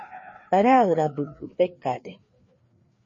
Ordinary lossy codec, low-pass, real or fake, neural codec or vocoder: MP3, 32 kbps; 7.2 kHz; fake; codec, 16 kHz, 2 kbps, FreqCodec, larger model